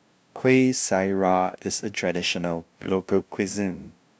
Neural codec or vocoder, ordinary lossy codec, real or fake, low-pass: codec, 16 kHz, 0.5 kbps, FunCodec, trained on LibriTTS, 25 frames a second; none; fake; none